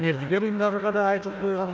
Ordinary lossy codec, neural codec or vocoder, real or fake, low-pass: none; codec, 16 kHz, 1 kbps, FunCodec, trained on Chinese and English, 50 frames a second; fake; none